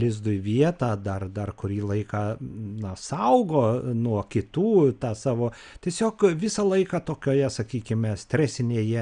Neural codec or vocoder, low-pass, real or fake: none; 9.9 kHz; real